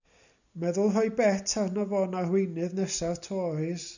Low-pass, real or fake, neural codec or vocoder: 7.2 kHz; real; none